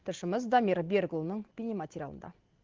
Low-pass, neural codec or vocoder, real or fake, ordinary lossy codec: 7.2 kHz; none; real; Opus, 16 kbps